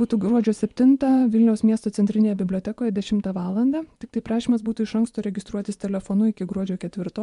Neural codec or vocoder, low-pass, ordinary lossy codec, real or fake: vocoder, 24 kHz, 100 mel bands, Vocos; 10.8 kHz; MP3, 64 kbps; fake